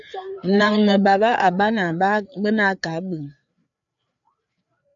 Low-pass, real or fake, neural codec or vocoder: 7.2 kHz; fake; codec, 16 kHz, 4 kbps, FreqCodec, larger model